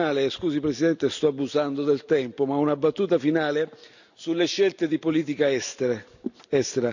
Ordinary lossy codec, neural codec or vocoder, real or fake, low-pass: none; none; real; 7.2 kHz